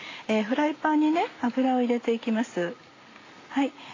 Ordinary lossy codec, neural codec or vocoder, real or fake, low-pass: AAC, 32 kbps; none; real; 7.2 kHz